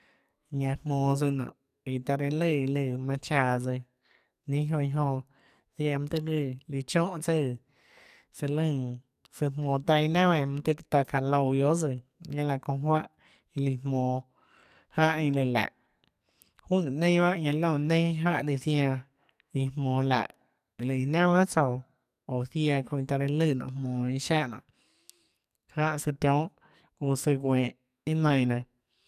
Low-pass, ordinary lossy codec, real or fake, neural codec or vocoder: 14.4 kHz; none; fake; codec, 44.1 kHz, 2.6 kbps, SNAC